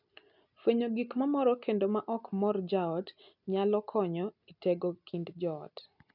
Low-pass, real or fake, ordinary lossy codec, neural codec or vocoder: 5.4 kHz; real; none; none